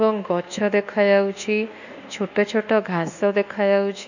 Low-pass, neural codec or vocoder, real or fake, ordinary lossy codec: 7.2 kHz; codec, 24 kHz, 1.2 kbps, DualCodec; fake; none